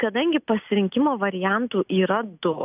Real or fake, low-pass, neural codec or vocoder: real; 3.6 kHz; none